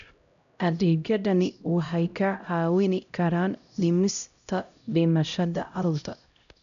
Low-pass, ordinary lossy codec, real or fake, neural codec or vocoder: 7.2 kHz; none; fake; codec, 16 kHz, 0.5 kbps, X-Codec, HuBERT features, trained on LibriSpeech